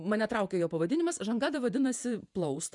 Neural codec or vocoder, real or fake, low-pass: vocoder, 48 kHz, 128 mel bands, Vocos; fake; 10.8 kHz